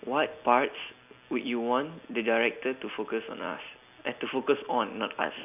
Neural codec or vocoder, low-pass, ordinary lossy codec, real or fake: none; 3.6 kHz; none; real